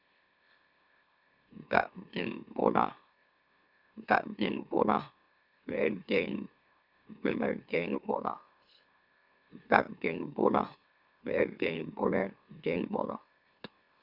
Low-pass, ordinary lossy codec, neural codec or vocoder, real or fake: 5.4 kHz; Opus, 64 kbps; autoencoder, 44.1 kHz, a latent of 192 numbers a frame, MeloTTS; fake